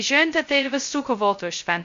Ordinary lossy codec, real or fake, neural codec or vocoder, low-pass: MP3, 48 kbps; fake; codec, 16 kHz, 0.2 kbps, FocalCodec; 7.2 kHz